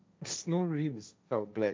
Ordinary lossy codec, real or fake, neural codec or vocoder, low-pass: none; fake; codec, 16 kHz, 1.1 kbps, Voila-Tokenizer; none